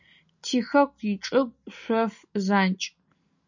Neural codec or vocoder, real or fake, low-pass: none; real; 7.2 kHz